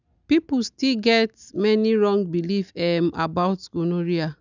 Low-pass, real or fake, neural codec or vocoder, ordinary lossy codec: 7.2 kHz; real; none; none